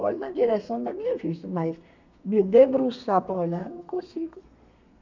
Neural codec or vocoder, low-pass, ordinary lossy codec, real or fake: codec, 32 kHz, 1.9 kbps, SNAC; 7.2 kHz; none; fake